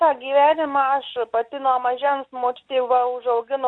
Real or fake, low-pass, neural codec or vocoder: real; 9.9 kHz; none